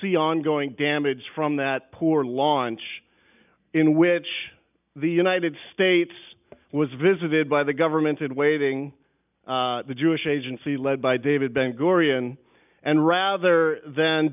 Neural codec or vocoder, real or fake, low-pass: none; real; 3.6 kHz